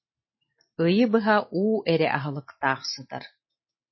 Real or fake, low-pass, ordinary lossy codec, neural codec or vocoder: real; 7.2 kHz; MP3, 24 kbps; none